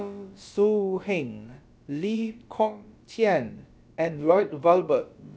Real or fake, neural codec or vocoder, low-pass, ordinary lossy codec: fake; codec, 16 kHz, about 1 kbps, DyCAST, with the encoder's durations; none; none